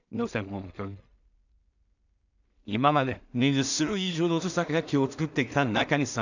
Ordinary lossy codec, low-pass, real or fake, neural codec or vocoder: none; 7.2 kHz; fake; codec, 16 kHz in and 24 kHz out, 0.4 kbps, LongCat-Audio-Codec, two codebook decoder